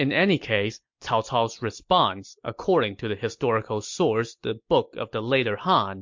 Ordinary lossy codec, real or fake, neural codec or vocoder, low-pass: MP3, 48 kbps; real; none; 7.2 kHz